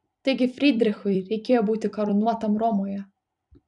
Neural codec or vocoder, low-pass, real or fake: vocoder, 44.1 kHz, 128 mel bands every 256 samples, BigVGAN v2; 10.8 kHz; fake